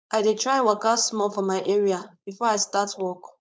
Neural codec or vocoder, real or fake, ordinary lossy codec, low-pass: codec, 16 kHz, 4.8 kbps, FACodec; fake; none; none